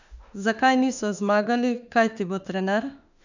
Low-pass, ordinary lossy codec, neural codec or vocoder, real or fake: 7.2 kHz; none; autoencoder, 48 kHz, 32 numbers a frame, DAC-VAE, trained on Japanese speech; fake